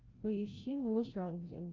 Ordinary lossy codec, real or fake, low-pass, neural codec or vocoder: Opus, 24 kbps; fake; 7.2 kHz; codec, 16 kHz, 0.5 kbps, FreqCodec, larger model